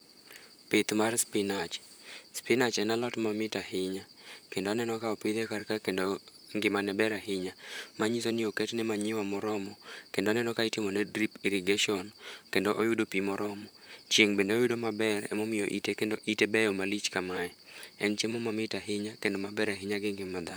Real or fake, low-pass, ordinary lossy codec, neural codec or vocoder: fake; none; none; vocoder, 44.1 kHz, 128 mel bands, Pupu-Vocoder